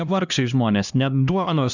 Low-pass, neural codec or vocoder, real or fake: 7.2 kHz; codec, 16 kHz, 1 kbps, X-Codec, HuBERT features, trained on LibriSpeech; fake